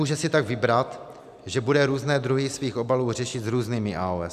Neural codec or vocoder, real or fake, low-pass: none; real; 14.4 kHz